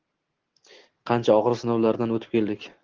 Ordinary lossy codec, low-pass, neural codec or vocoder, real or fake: Opus, 16 kbps; 7.2 kHz; none; real